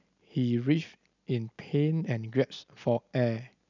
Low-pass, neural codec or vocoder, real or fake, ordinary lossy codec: 7.2 kHz; none; real; none